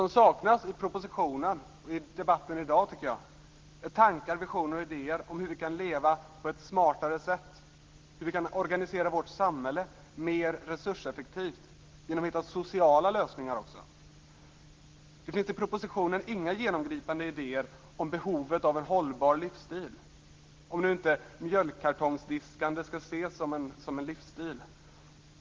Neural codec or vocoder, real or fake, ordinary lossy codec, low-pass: none; real; Opus, 16 kbps; 7.2 kHz